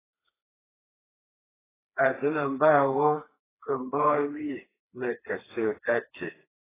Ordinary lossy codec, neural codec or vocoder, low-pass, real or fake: AAC, 16 kbps; codec, 16 kHz, 2 kbps, FreqCodec, smaller model; 3.6 kHz; fake